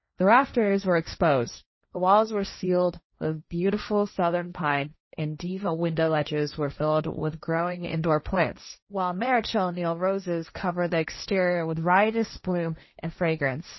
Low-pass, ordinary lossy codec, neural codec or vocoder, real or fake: 7.2 kHz; MP3, 24 kbps; codec, 16 kHz, 1.1 kbps, Voila-Tokenizer; fake